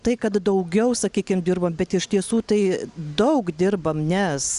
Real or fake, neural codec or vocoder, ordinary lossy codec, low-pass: real; none; Opus, 64 kbps; 10.8 kHz